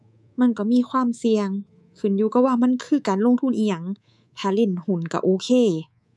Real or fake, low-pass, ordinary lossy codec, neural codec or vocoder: fake; none; none; codec, 24 kHz, 3.1 kbps, DualCodec